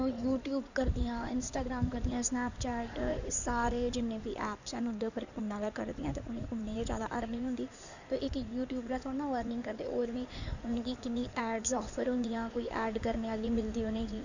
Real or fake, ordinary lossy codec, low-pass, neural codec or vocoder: fake; none; 7.2 kHz; codec, 16 kHz in and 24 kHz out, 2.2 kbps, FireRedTTS-2 codec